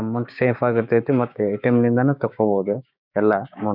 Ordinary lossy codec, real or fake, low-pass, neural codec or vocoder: none; fake; 5.4 kHz; codec, 16 kHz, 6 kbps, DAC